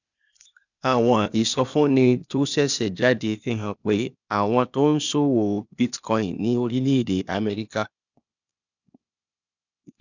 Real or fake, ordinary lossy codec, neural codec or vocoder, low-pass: fake; none; codec, 16 kHz, 0.8 kbps, ZipCodec; 7.2 kHz